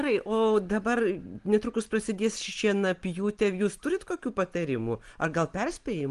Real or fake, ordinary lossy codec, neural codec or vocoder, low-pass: real; Opus, 24 kbps; none; 10.8 kHz